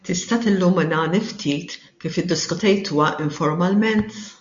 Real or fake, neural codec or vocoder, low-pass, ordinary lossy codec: real; none; 7.2 kHz; MP3, 48 kbps